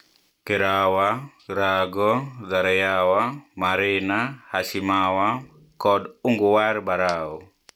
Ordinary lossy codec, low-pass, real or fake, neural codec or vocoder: none; 19.8 kHz; real; none